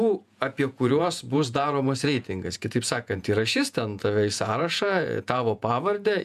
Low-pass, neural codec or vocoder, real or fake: 14.4 kHz; vocoder, 48 kHz, 128 mel bands, Vocos; fake